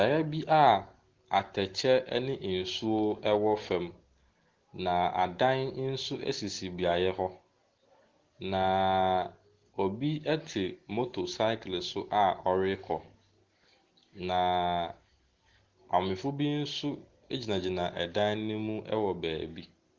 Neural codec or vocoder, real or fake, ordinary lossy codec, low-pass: none; real; Opus, 16 kbps; 7.2 kHz